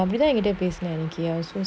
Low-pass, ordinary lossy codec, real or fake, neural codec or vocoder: none; none; real; none